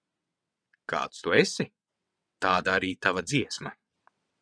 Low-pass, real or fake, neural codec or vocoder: 9.9 kHz; fake; vocoder, 22.05 kHz, 80 mel bands, WaveNeXt